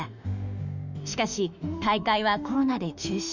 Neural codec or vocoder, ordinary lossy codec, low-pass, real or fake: autoencoder, 48 kHz, 32 numbers a frame, DAC-VAE, trained on Japanese speech; none; 7.2 kHz; fake